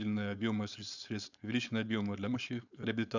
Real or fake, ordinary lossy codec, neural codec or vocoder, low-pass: fake; none; codec, 16 kHz, 4.8 kbps, FACodec; 7.2 kHz